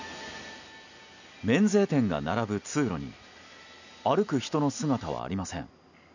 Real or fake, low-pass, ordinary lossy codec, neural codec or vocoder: real; 7.2 kHz; none; none